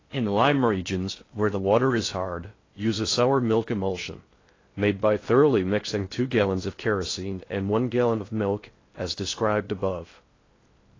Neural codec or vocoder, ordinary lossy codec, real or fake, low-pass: codec, 16 kHz in and 24 kHz out, 0.6 kbps, FocalCodec, streaming, 4096 codes; AAC, 32 kbps; fake; 7.2 kHz